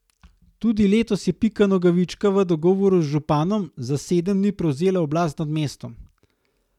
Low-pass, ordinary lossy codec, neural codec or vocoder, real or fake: 19.8 kHz; none; none; real